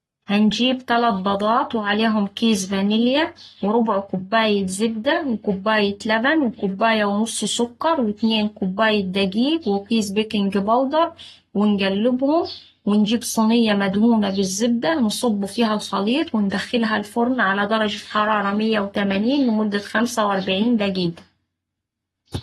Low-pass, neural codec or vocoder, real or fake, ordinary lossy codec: 19.8 kHz; codec, 44.1 kHz, 7.8 kbps, Pupu-Codec; fake; AAC, 32 kbps